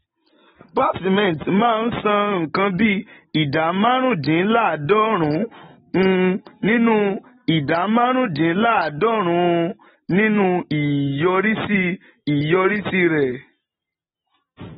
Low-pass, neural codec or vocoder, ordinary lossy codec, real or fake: 19.8 kHz; none; AAC, 16 kbps; real